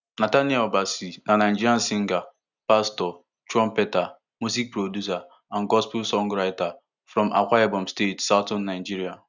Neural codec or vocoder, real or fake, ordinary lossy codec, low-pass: none; real; none; 7.2 kHz